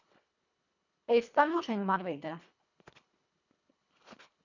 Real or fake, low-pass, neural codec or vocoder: fake; 7.2 kHz; codec, 24 kHz, 1.5 kbps, HILCodec